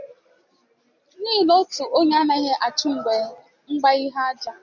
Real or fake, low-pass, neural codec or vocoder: real; 7.2 kHz; none